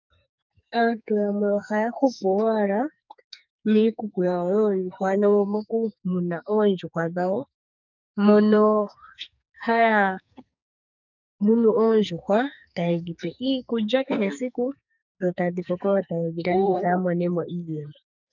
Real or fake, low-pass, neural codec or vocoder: fake; 7.2 kHz; codec, 44.1 kHz, 2.6 kbps, SNAC